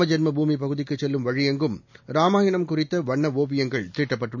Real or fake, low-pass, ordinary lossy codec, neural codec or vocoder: real; 7.2 kHz; none; none